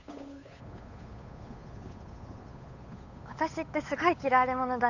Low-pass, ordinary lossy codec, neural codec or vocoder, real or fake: 7.2 kHz; none; codec, 16 kHz, 8 kbps, FunCodec, trained on Chinese and English, 25 frames a second; fake